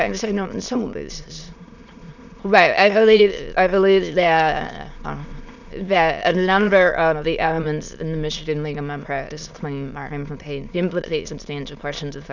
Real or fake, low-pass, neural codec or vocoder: fake; 7.2 kHz; autoencoder, 22.05 kHz, a latent of 192 numbers a frame, VITS, trained on many speakers